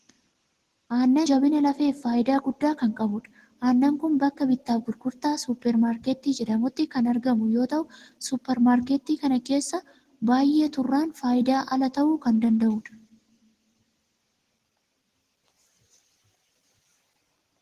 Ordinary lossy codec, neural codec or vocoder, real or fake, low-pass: Opus, 16 kbps; none; real; 14.4 kHz